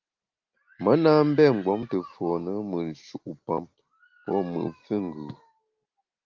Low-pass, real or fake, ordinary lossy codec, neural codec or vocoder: 7.2 kHz; real; Opus, 24 kbps; none